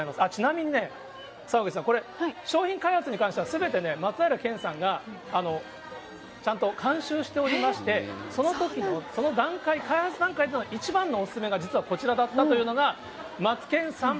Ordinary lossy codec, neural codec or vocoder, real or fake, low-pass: none; none; real; none